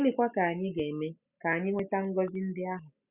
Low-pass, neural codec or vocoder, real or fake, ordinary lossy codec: 3.6 kHz; none; real; Opus, 64 kbps